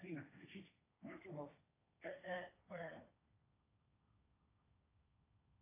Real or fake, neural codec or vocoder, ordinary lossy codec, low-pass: fake; codec, 16 kHz, 1.1 kbps, Voila-Tokenizer; AAC, 24 kbps; 3.6 kHz